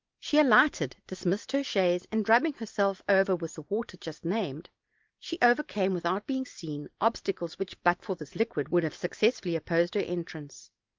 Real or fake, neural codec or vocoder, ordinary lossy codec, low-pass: real; none; Opus, 32 kbps; 7.2 kHz